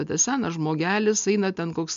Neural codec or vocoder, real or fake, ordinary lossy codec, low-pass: none; real; MP3, 64 kbps; 7.2 kHz